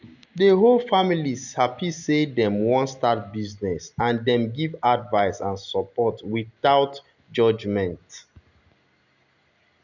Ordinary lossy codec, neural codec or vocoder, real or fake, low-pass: none; none; real; 7.2 kHz